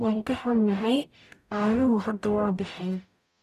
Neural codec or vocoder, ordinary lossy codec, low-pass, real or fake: codec, 44.1 kHz, 0.9 kbps, DAC; none; 14.4 kHz; fake